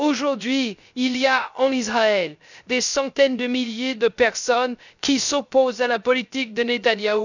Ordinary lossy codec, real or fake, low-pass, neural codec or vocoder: none; fake; 7.2 kHz; codec, 16 kHz, 0.3 kbps, FocalCodec